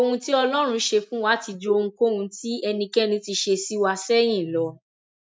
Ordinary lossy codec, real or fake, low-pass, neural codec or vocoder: none; real; none; none